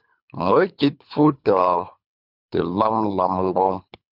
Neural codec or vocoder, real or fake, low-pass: codec, 24 kHz, 3 kbps, HILCodec; fake; 5.4 kHz